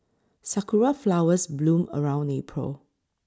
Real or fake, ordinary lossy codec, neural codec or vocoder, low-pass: real; none; none; none